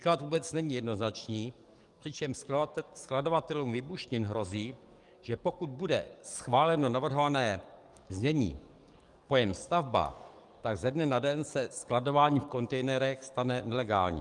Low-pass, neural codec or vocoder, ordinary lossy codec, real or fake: 10.8 kHz; codec, 44.1 kHz, 7.8 kbps, DAC; Opus, 32 kbps; fake